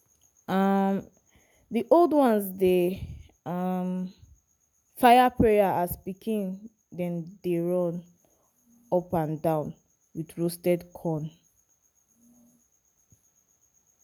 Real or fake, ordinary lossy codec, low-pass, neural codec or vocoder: real; none; 19.8 kHz; none